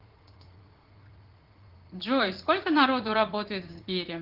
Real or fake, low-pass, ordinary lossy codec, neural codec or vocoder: fake; 5.4 kHz; Opus, 16 kbps; vocoder, 44.1 kHz, 80 mel bands, Vocos